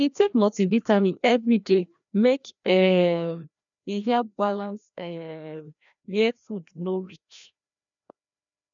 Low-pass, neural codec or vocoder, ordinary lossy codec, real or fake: 7.2 kHz; codec, 16 kHz, 1 kbps, FreqCodec, larger model; none; fake